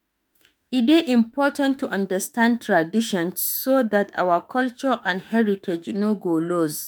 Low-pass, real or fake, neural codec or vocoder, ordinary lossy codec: none; fake; autoencoder, 48 kHz, 32 numbers a frame, DAC-VAE, trained on Japanese speech; none